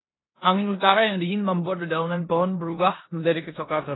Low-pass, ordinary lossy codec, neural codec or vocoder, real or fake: 7.2 kHz; AAC, 16 kbps; codec, 16 kHz in and 24 kHz out, 0.9 kbps, LongCat-Audio-Codec, four codebook decoder; fake